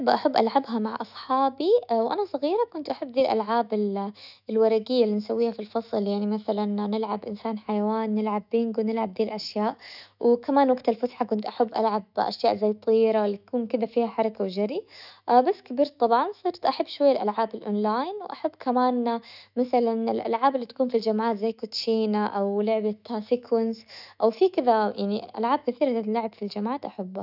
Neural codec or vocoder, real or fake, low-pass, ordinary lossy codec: autoencoder, 48 kHz, 128 numbers a frame, DAC-VAE, trained on Japanese speech; fake; 5.4 kHz; none